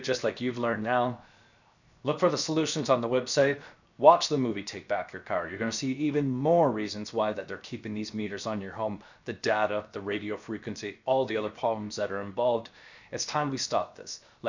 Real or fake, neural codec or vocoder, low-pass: fake; codec, 16 kHz, 0.7 kbps, FocalCodec; 7.2 kHz